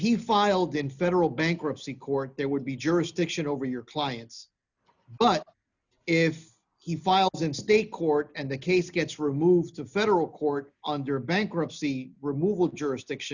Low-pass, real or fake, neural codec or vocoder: 7.2 kHz; real; none